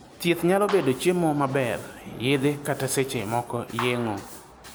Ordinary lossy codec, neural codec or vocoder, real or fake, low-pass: none; none; real; none